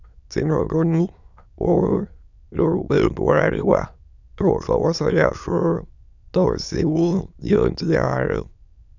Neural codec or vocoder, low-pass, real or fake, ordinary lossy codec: autoencoder, 22.05 kHz, a latent of 192 numbers a frame, VITS, trained on many speakers; 7.2 kHz; fake; none